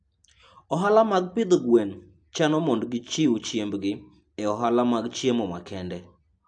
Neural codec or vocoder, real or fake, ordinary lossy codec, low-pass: none; real; AAC, 64 kbps; 9.9 kHz